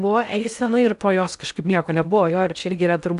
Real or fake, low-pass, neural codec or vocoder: fake; 10.8 kHz; codec, 16 kHz in and 24 kHz out, 0.6 kbps, FocalCodec, streaming, 2048 codes